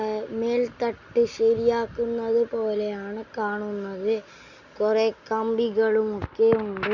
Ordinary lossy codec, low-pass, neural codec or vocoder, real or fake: none; 7.2 kHz; none; real